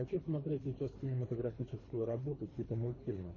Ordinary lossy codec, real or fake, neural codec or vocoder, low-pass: MP3, 32 kbps; fake; codec, 24 kHz, 3 kbps, HILCodec; 7.2 kHz